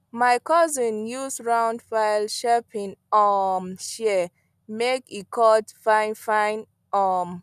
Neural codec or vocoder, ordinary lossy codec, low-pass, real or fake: none; none; 14.4 kHz; real